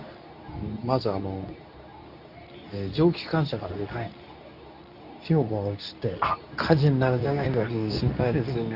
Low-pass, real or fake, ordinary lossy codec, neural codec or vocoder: 5.4 kHz; fake; none; codec, 24 kHz, 0.9 kbps, WavTokenizer, medium speech release version 2